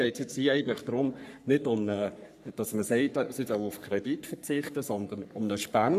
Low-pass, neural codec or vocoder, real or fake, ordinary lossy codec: 14.4 kHz; codec, 44.1 kHz, 3.4 kbps, Pupu-Codec; fake; none